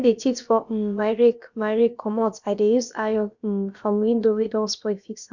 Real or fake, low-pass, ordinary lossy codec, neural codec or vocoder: fake; 7.2 kHz; none; codec, 16 kHz, about 1 kbps, DyCAST, with the encoder's durations